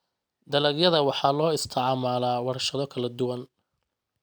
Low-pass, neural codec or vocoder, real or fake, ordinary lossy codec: none; none; real; none